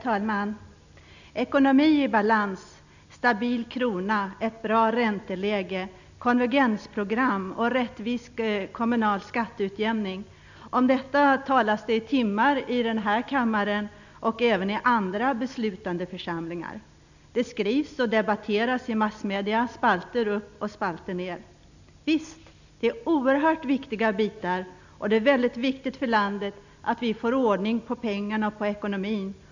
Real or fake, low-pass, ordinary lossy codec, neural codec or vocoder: real; 7.2 kHz; none; none